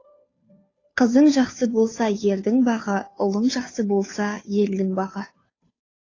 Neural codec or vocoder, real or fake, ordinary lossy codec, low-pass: codec, 16 kHz, 2 kbps, FunCodec, trained on Chinese and English, 25 frames a second; fake; AAC, 32 kbps; 7.2 kHz